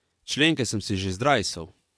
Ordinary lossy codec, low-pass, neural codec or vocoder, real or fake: none; none; vocoder, 22.05 kHz, 80 mel bands, WaveNeXt; fake